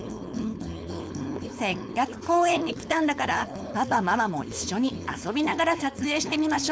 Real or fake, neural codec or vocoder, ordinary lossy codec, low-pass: fake; codec, 16 kHz, 4.8 kbps, FACodec; none; none